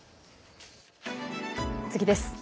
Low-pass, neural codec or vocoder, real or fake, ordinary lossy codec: none; none; real; none